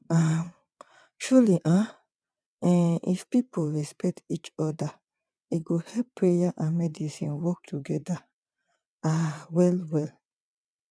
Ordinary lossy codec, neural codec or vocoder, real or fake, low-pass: none; vocoder, 22.05 kHz, 80 mel bands, WaveNeXt; fake; none